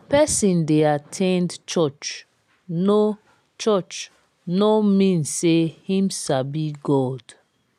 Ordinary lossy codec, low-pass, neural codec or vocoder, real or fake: none; 14.4 kHz; none; real